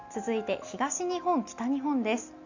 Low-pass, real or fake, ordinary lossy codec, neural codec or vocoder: 7.2 kHz; real; none; none